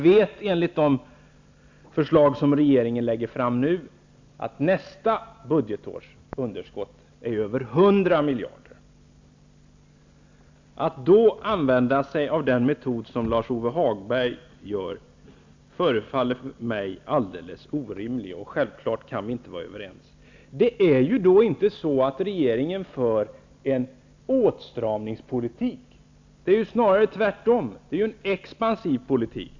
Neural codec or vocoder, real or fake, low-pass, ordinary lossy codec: none; real; 7.2 kHz; MP3, 64 kbps